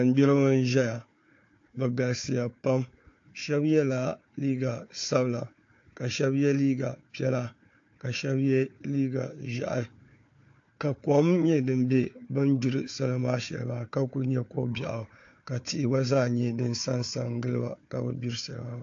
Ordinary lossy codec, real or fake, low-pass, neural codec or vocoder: AAC, 48 kbps; fake; 7.2 kHz; codec, 16 kHz, 4 kbps, FunCodec, trained on Chinese and English, 50 frames a second